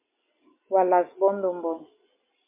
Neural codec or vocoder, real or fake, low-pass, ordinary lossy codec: none; real; 3.6 kHz; MP3, 24 kbps